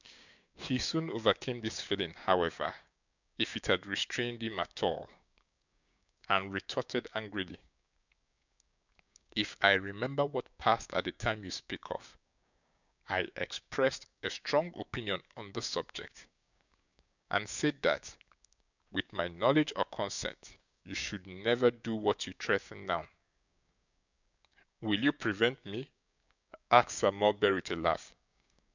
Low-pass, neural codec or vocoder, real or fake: 7.2 kHz; codec, 16 kHz, 6 kbps, DAC; fake